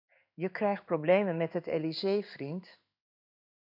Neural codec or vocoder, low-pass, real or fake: codec, 16 kHz in and 24 kHz out, 1 kbps, XY-Tokenizer; 5.4 kHz; fake